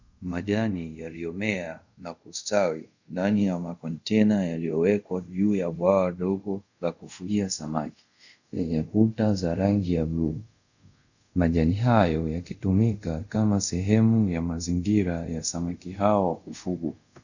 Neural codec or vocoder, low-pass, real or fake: codec, 24 kHz, 0.5 kbps, DualCodec; 7.2 kHz; fake